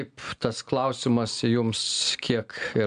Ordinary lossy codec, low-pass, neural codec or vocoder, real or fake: MP3, 96 kbps; 9.9 kHz; none; real